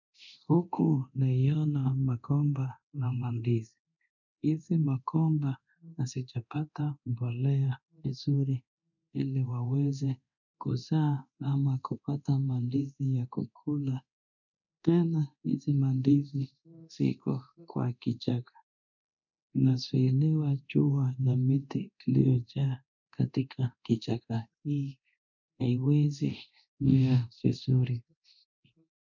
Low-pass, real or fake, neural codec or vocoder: 7.2 kHz; fake; codec, 24 kHz, 0.9 kbps, DualCodec